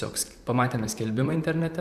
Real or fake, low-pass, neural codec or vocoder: fake; 14.4 kHz; vocoder, 44.1 kHz, 128 mel bands, Pupu-Vocoder